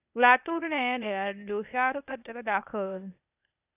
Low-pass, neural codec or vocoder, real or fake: 3.6 kHz; codec, 16 kHz, 0.8 kbps, ZipCodec; fake